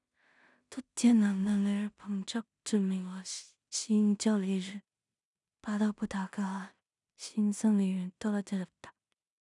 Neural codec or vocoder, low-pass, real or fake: codec, 16 kHz in and 24 kHz out, 0.4 kbps, LongCat-Audio-Codec, two codebook decoder; 10.8 kHz; fake